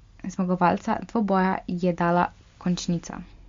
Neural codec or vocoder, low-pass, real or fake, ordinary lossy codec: none; 7.2 kHz; real; MP3, 48 kbps